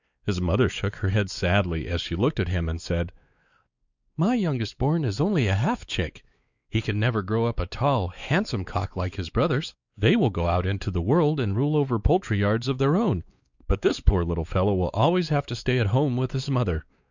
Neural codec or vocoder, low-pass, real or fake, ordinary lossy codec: codec, 16 kHz, 4 kbps, X-Codec, WavLM features, trained on Multilingual LibriSpeech; 7.2 kHz; fake; Opus, 64 kbps